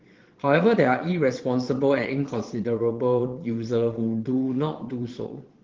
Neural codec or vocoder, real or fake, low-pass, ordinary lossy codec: vocoder, 22.05 kHz, 80 mel bands, Vocos; fake; 7.2 kHz; Opus, 16 kbps